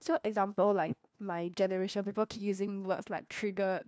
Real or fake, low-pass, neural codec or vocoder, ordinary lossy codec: fake; none; codec, 16 kHz, 1 kbps, FunCodec, trained on LibriTTS, 50 frames a second; none